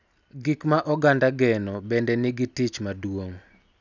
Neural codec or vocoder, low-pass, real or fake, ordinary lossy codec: none; 7.2 kHz; real; none